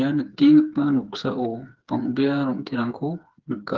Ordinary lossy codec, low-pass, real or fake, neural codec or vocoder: Opus, 16 kbps; 7.2 kHz; fake; codec, 16 kHz, 4 kbps, FreqCodec, smaller model